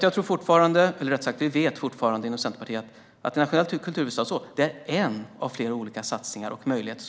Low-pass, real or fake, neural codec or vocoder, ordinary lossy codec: none; real; none; none